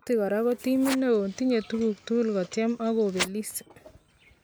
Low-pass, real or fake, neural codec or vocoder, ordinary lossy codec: none; real; none; none